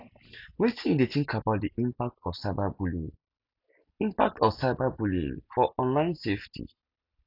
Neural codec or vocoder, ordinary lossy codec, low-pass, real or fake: none; none; 5.4 kHz; real